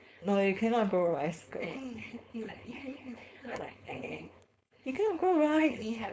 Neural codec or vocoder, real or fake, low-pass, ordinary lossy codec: codec, 16 kHz, 4.8 kbps, FACodec; fake; none; none